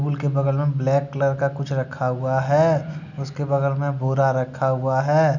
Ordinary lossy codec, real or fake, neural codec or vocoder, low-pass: none; real; none; 7.2 kHz